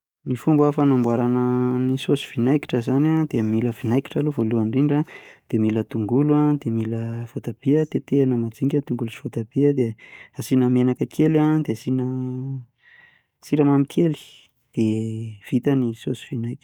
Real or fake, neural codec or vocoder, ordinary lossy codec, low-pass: fake; codec, 44.1 kHz, 7.8 kbps, DAC; none; 19.8 kHz